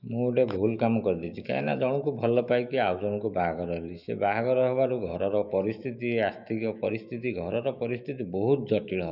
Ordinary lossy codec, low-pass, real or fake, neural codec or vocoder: none; 5.4 kHz; fake; autoencoder, 48 kHz, 128 numbers a frame, DAC-VAE, trained on Japanese speech